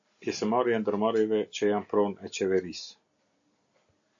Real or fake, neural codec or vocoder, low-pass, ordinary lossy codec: real; none; 7.2 kHz; AAC, 64 kbps